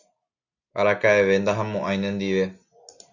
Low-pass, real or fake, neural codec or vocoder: 7.2 kHz; real; none